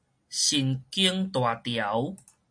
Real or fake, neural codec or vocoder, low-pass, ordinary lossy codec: real; none; 9.9 kHz; MP3, 48 kbps